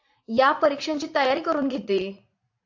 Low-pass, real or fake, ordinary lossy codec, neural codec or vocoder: 7.2 kHz; fake; AAC, 48 kbps; vocoder, 44.1 kHz, 80 mel bands, Vocos